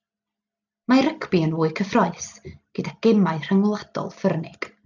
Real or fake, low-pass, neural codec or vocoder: real; 7.2 kHz; none